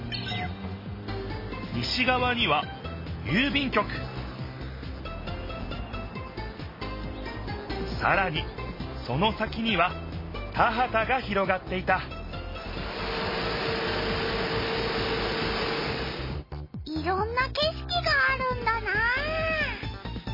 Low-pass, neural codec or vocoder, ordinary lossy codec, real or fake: 5.4 kHz; none; MP3, 24 kbps; real